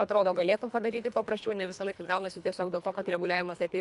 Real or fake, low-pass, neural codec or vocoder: fake; 10.8 kHz; codec, 24 kHz, 1.5 kbps, HILCodec